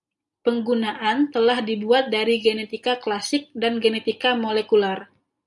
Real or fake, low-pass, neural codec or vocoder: real; 10.8 kHz; none